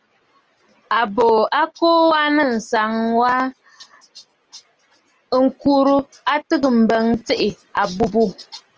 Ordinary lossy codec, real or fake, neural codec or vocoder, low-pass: Opus, 24 kbps; real; none; 7.2 kHz